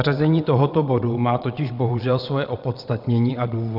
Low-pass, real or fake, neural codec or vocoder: 5.4 kHz; fake; vocoder, 22.05 kHz, 80 mel bands, WaveNeXt